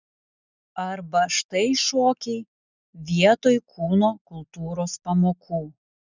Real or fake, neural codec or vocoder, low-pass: real; none; 7.2 kHz